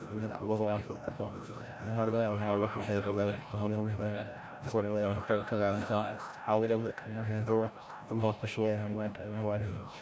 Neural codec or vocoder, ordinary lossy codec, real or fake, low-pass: codec, 16 kHz, 0.5 kbps, FreqCodec, larger model; none; fake; none